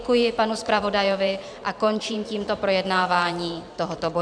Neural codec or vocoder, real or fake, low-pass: vocoder, 44.1 kHz, 128 mel bands every 512 samples, BigVGAN v2; fake; 9.9 kHz